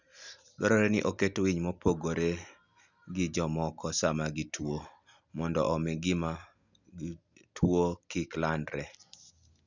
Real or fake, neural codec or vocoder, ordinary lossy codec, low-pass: real; none; none; 7.2 kHz